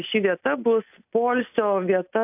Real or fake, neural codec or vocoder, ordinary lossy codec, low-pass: real; none; AAC, 32 kbps; 3.6 kHz